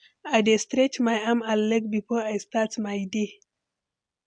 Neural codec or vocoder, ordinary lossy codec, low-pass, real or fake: none; MP3, 64 kbps; 9.9 kHz; real